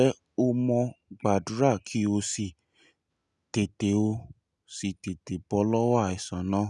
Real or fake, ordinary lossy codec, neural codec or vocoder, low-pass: real; none; none; 10.8 kHz